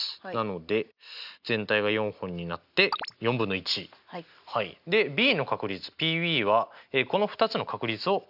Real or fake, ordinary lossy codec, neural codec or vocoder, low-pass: real; none; none; 5.4 kHz